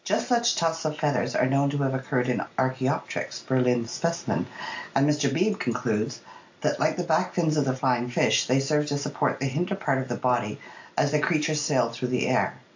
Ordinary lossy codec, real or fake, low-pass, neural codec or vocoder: AAC, 48 kbps; real; 7.2 kHz; none